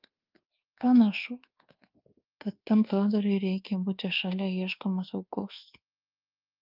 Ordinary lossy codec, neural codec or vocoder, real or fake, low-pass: Opus, 24 kbps; codec, 24 kHz, 1.2 kbps, DualCodec; fake; 5.4 kHz